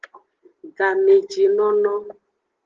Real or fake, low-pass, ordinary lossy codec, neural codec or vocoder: real; 7.2 kHz; Opus, 16 kbps; none